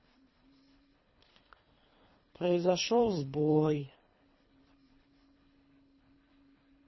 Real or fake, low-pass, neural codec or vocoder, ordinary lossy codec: fake; 7.2 kHz; codec, 24 kHz, 3 kbps, HILCodec; MP3, 24 kbps